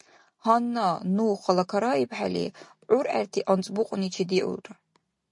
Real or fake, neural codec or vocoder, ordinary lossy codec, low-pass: real; none; MP3, 48 kbps; 10.8 kHz